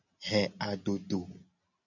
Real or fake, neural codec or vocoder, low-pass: real; none; 7.2 kHz